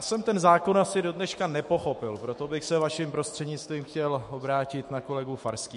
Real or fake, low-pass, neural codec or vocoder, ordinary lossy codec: fake; 14.4 kHz; autoencoder, 48 kHz, 128 numbers a frame, DAC-VAE, trained on Japanese speech; MP3, 48 kbps